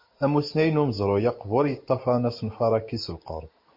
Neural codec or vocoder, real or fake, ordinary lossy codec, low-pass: none; real; MP3, 32 kbps; 5.4 kHz